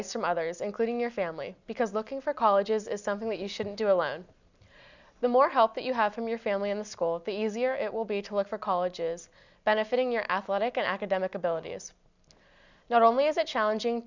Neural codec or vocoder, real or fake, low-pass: none; real; 7.2 kHz